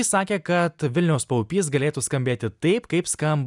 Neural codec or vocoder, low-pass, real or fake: none; 10.8 kHz; real